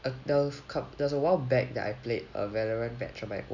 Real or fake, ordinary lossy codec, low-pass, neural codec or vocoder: real; none; 7.2 kHz; none